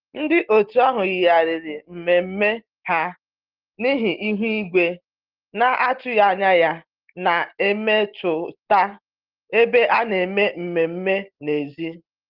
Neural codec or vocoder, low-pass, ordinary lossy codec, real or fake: none; 5.4 kHz; Opus, 16 kbps; real